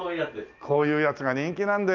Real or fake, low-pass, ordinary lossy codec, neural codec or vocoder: real; 7.2 kHz; Opus, 24 kbps; none